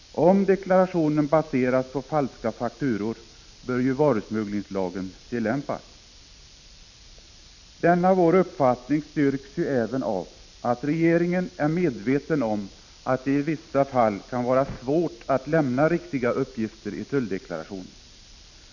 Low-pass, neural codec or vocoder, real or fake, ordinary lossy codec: 7.2 kHz; none; real; none